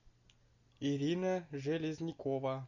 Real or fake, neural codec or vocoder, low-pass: real; none; 7.2 kHz